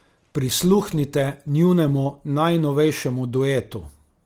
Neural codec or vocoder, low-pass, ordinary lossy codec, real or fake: none; 19.8 kHz; Opus, 24 kbps; real